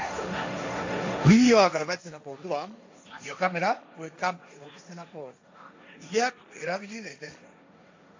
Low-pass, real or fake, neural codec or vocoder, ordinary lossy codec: 7.2 kHz; fake; codec, 16 kHz, 1.1 kbps, Voila-Tokenizer; AAC, 48 kbps